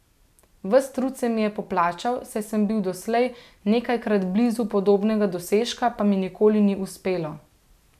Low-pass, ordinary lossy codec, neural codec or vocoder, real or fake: 14.4 kHz; none; none; real